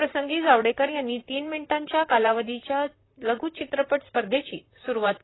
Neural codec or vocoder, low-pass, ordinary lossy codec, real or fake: vocoder, 44.1 kHz, 128 mel bands, Pupu-Vocoder; 7.2 kHz; AAC, 16 kbps; fake